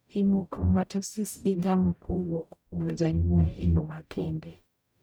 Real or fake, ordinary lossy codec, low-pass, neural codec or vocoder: fake; none; none; codec, 44.1 kHz, 0.9 kbps, DAC